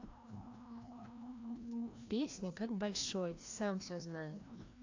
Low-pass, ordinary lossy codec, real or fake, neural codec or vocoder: 7.2 kHz; AAC, 48 kbps; fake; codec, 16 kHz, 1 kbps, FreqCodec, larger model